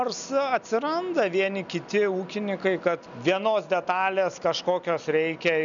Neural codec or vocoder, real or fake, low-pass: none; real; 7.2 kHz